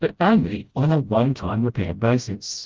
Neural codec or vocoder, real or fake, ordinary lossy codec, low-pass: codec, 16 kHz, 0.5 kbps, FreqCodec, smaller model; fake; Opus, 32 kbps; 7.2 kHz